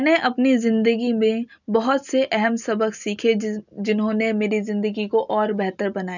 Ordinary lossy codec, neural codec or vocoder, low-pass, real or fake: none; none; 7.2 kHz; real